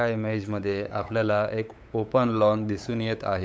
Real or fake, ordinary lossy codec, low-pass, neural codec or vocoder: fake; none; none; codec, 16 kHz, 8 kbps, FunCodec, trained on LibriTTS, 25 frames a second